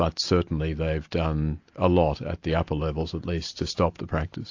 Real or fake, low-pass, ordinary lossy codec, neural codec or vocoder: real; 7.2 kHz; AAC, 48 kbps; none